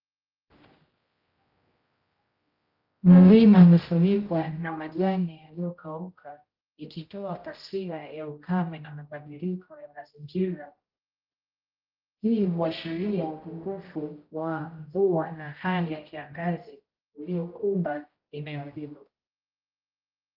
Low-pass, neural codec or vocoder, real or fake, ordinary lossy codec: 5.4 kHz; codec, 16 kHz, 0.5 kbps, X-Codec, HuBERT features, trained on general audio; fake; Opus, 32 kbps